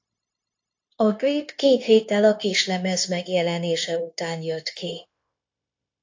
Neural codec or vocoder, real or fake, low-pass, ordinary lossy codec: codec, 16 kHz, 0.9 kbps, LongCat-Audio-Codec; fake; 7.2 kHz; AAC, 48 kbps